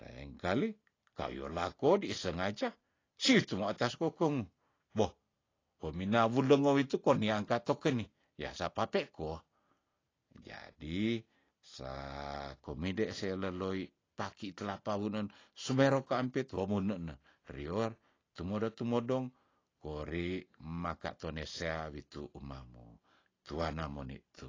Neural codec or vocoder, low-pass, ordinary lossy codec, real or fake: none; 7.2 kHz; AAC, 32 kbps; real